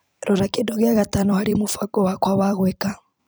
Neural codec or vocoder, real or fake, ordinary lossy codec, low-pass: vocoder, 44.1 kHz, 128 mel bands every 256 samples, BigVGAN v2; fake; none; none